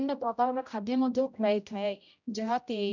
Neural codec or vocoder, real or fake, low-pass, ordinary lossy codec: codec, 16 kHz, 0.5 kbps, X-Codec, HuBERT features, trained on general audio; fake; 7.2 kHz; none